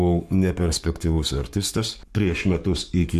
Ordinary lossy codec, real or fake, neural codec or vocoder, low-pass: MP3, 96 kbps; fake; codec, 44.1 kHz, 7.8 kbps, Pupu-Codec; 14.4 kHz